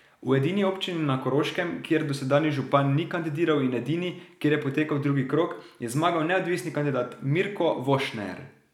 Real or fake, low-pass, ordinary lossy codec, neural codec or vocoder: real; 19.8 kHz; none; none